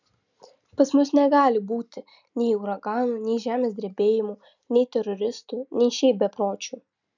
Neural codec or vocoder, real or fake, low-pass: none; real; 7.2 kHz